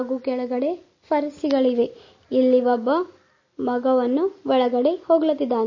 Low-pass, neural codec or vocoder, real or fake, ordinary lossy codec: 7.2 kHz; none; real; MP3, 32 kbps